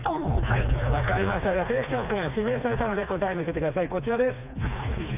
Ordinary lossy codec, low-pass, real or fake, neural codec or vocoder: none; 3.6 kHz; fake; codec, 16 kHz, 2 kbps, FreqCodec, smaller model